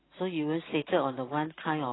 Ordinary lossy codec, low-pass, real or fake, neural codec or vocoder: AAC, 16 kbps; 7.2 kHz; real; none